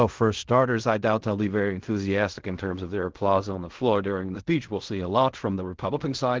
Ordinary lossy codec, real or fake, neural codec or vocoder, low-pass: Opus, 16 kbps; fake; codec, 16 kHz in and 24 kHz out, 0.4 kbps, LongCat-Audio-Codec, fine tuned four codebook decoder; 7.2 kHz